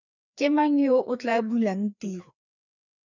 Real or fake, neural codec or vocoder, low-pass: fake; codec, 16 kHz, 2 kbps, FreqCodec, larger model; 7.2 kHz